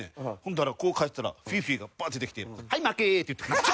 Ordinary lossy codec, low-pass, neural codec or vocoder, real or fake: none; none; none; real